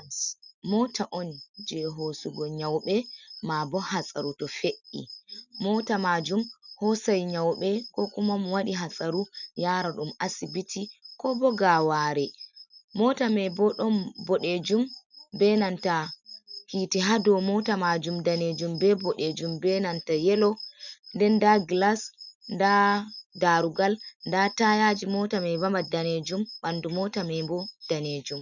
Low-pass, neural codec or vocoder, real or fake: 7.2 kHz; none; real